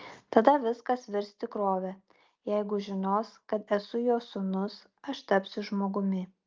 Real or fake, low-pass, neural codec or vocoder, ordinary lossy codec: real; 7.2 kHz; none; Opus, 24 kbps